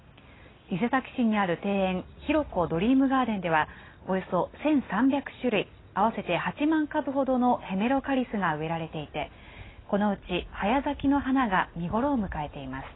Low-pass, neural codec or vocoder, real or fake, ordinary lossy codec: 7.2 kHz; none; real; AAC, 16 kbps